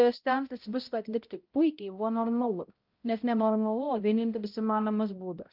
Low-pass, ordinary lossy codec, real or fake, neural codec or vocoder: 5.4 kHz; Opus, 24 kbps; fake; codec, 16 kHz, 0.5 kbps, X-Codec, HuBERT features, trained on balanced general audio